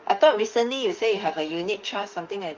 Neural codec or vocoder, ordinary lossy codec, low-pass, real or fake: autoencoder, 48 kHz, 32 numbers a frame, DAC-VAE, trained on Japanese speech; Opus, 24 kbps; 7.2 kHz; fake